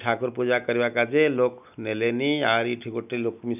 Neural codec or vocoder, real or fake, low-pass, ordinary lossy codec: none; real; 3.6 kHz; none